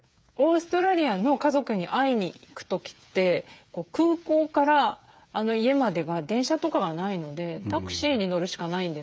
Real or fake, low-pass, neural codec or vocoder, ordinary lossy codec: fake; none; codec, 16 kHz, 8 kbps, FreqCodec, smaller model; none